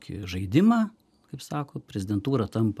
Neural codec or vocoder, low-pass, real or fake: none; 14.4 kHz; real